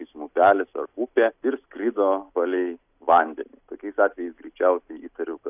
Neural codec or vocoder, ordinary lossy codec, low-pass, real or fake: none; AAC, 32 kbps; 3.6 kHz; real